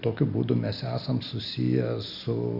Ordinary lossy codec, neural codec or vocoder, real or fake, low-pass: Opus, 64 kbps; none; real; 5.4 kHz